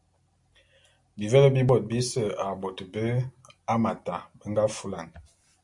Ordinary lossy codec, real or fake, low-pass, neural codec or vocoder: AAC, 64 kbps; real; 10.8 kHz; none